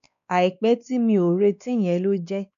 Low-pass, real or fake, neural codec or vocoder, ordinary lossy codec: 7.2 kHz; fake; codec, 16 kHz, 2 kbps, X-Codec, WavLM features, trained on Multilingual LibriSpeech; none